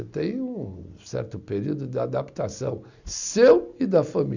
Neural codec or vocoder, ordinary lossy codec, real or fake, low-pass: none; none; real; 7.2 kHz